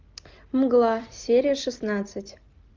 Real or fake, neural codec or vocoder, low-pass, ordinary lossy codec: real; none; 7.2 kHz; Opus, 32 kbps